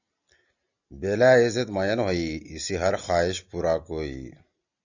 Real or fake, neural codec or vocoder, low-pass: real; none; 7.2 kHz